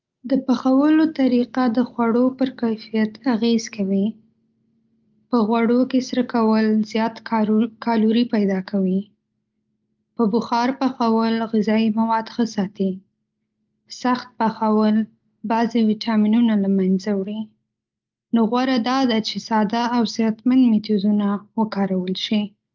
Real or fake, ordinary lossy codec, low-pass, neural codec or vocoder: real; Opus, 32 kbps; 7.2 kHz; none